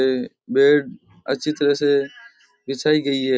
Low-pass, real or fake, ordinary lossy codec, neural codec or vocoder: none; real; none; none